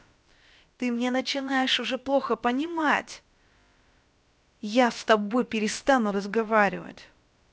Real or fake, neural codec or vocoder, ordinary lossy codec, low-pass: fake; codec, 16 kHz, about 1 kbps, DyCAST, with the encoder's durations; none; none